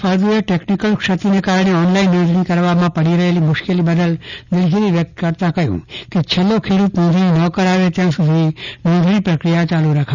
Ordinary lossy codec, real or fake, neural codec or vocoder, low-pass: none; real; none; 7.2 kHz